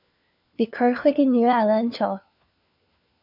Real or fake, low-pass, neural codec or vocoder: fake; 5.4 kHz; codec, 16 kHz, 4 kbps, FunCodec, trained on LibriTTS, 50 frames a second